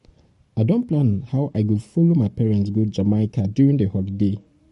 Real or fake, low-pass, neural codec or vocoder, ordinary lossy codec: fake; 14.4 kHz; codec, 44.1 kHz, 7.8 kbps, Pupu-Codec; MP3, 48 kbps